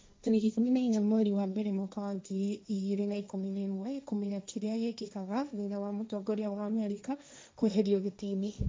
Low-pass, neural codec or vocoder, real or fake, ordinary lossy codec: none; codec, 16 kHz, 1.1 kbps, Voila-Tokenizer; fake; none